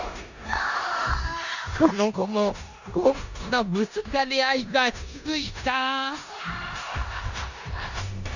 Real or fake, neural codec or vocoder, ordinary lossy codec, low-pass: fake; codec, 16 kHz in and 24 kHz out, 0.9 kbps, LongCat-Audio-Codec, four codebook decoder; none; 7.2 kHz